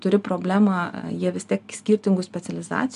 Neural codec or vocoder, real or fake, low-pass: none; real; 10.8 kHz